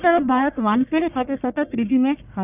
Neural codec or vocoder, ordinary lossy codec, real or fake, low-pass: codec, 44.1 kHz, 1.7 kbps, Pupu-Codec; none; fake; 3.6 kHz